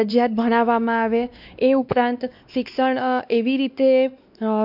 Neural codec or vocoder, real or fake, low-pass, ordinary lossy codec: codec, 16 kHz, 2 kbps, X-Codec, WavLM features, trained on Multilingual LibriSpeech; fake; 5.4 kHz; none